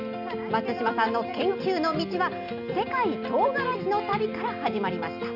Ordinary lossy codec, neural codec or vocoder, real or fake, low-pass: none; none; real; 5.4 kHz